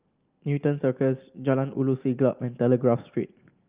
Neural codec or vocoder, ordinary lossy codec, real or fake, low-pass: none; Opus, 32 kbps; real; 3.6 kHz